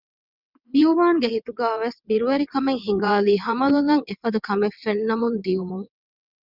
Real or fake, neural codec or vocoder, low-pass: fake; vocoder, 22.05 kHz, 80 mel bands, WaveNeXt; 5.4 kHz